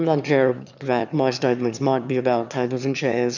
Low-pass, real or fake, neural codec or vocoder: 7.2 kHz; fake; autoencoder, 22.05 kHz, a latent of 192 numbers a frame, VITS, trained on one speaker